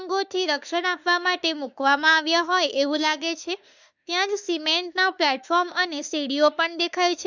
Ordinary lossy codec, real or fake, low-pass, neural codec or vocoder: none; fake; 7.2 kHz; autoencoder, 48 kHz, 32 numbers a frame, DAC-VAE, trained on Japanese speech